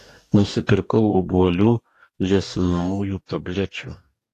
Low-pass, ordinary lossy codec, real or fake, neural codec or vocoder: 14.4 kHz; AAC, 48 kbps; fake; codec, 44.1 kHz, 2.6 kbps, DAC